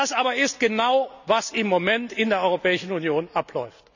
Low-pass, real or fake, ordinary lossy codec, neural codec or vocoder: 7.2 kHz; real; none; none